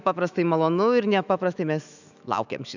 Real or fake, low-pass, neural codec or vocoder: fake; 7.2 kHz; autoencoder, 48 kHz, 128 numbers a frame, DAC-VAE, trained on Japanese speech